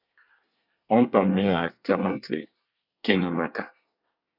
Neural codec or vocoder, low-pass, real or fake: codec, 24 kHz, 1 kbps, SNAC; 5.4 kHz; fake